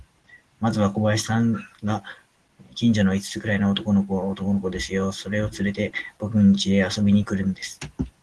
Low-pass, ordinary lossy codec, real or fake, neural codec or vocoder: 10.8 kHz; Opus, 16 kbps; real; none